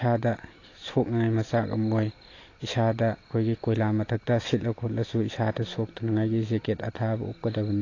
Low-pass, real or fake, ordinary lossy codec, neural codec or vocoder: 7.2 kHz; real; AAC, 32 kbps; none